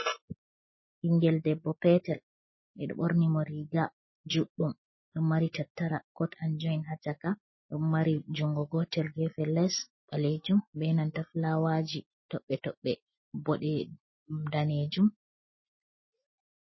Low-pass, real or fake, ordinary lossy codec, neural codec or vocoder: 7.2 kHz; real; MP3, 24 kbps; none